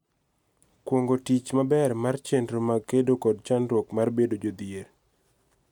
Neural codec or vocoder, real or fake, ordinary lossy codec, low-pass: none; real; none; 19.8 kHz